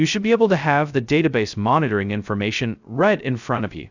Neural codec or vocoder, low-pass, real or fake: codec, 16 kHz, 0.2 kbps, FocalCodec; 7.2 kHz; fake